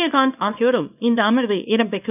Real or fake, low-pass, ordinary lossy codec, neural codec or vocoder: fake; 3.6 kHz; none; codec, 24 kHz, 0.9 kbps, WavTokenizer, small release